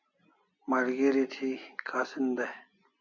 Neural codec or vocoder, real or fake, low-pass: none; real; 7.2 kHz